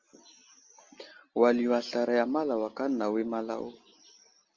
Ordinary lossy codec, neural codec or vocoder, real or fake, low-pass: Opus, 32 kbps; none; real; 7.2 kHz